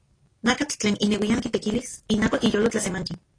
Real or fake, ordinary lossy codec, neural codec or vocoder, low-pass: real; AAC, 32 kbps; none; 9.9 kHz